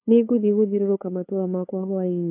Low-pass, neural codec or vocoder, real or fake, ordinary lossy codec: 3.6 kHz; codec, 16 kHz, 8 kbps, FunCodec, trained on LibriTTS, 25 frames a second; fake; AAC, 32 kbps